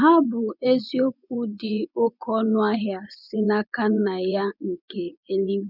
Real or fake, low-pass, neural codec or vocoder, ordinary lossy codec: fake; 5.4 kHz; vocoder, 24 kHz, 100 mel bands, Vocos; none